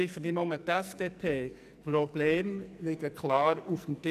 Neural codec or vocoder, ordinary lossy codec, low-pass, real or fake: codec, 44.1 kHz, 2.6 kbps, SNAC; none; 14.4 kHz; fake